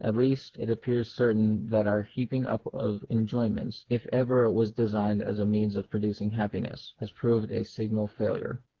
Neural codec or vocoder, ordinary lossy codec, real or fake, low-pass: codec, 16 kHz, 4 kbps, FreqCodec, smaller model; Opus, 16 kbps; fake; 7.2 kHz